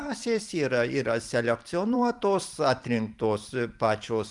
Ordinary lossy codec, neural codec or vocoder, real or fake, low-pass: Opus, 32 kbps; vocoder, 44.1 kHz, 128 mel bands every 512 samples, BigVGAN v2; fake; 10.8 kHz